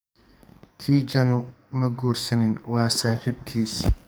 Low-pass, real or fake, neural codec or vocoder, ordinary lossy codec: none; fake; codec, 44.1 kHz, 2.6 kbps, SNAC; none